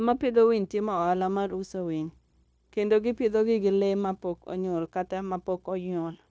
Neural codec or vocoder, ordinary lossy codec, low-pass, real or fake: codec, 16 kHz, 0.9 kbps, LongCat-Audio-Codec; none; none; fake